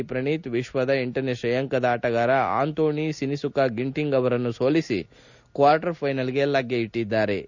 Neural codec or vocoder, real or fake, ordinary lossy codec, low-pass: none; real; none; 7.2 kHz